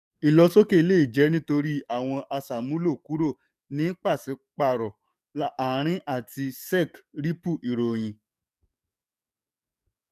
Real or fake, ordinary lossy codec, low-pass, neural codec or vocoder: fake; none; 14.4 kHz; codec, 44.1 kHz, 7.8 kbps, DAC